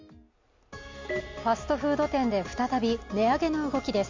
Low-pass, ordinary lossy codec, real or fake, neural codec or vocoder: 7.2 kHz; MP3, 48 kbps; real; none